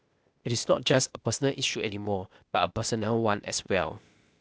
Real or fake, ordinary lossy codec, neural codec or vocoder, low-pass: fake; none; codec, 16 kHz, 0.8 kbps, ZipCodec; none